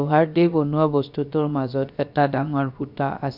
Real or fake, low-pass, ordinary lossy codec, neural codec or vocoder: fake; 5.4 kHz; none; codec, 16 kHz, about 1 kbps, DyCAST, with the encoder's durations